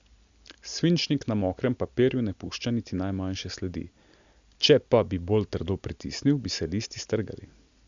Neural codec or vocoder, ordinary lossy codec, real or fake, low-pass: none; Opus, 64 kbps; real; 7.2 kHz